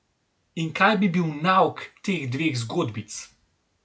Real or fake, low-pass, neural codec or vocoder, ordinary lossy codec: real; none; none; none